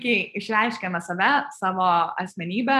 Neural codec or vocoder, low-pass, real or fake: none; 14.4 kHz; real